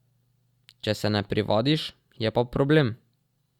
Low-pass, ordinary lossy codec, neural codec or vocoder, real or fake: 19.8 kHz; Opus, 64 kbps; none; real